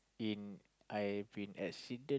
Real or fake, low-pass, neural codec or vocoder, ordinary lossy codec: real; none; none; none